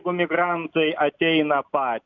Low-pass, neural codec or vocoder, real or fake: 7.2 kHz; none; real